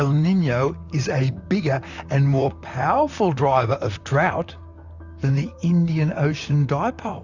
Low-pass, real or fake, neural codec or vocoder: 7.2 kHz; fake; vocoder, 44.1 kHz, 128 mel bands, Pupu-Vocoder